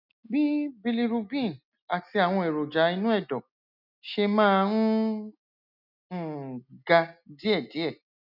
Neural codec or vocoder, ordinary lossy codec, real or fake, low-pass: none; none; real; 5.4 kHz